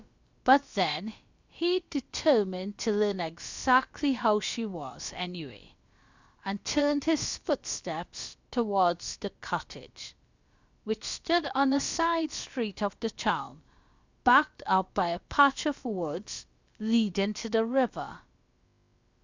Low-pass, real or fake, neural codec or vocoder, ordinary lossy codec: 7.2 kHz; fake; codec, 16 kHz, about 1 kbps, DyCAST, with the encoder's durations; Opus, 64 kbps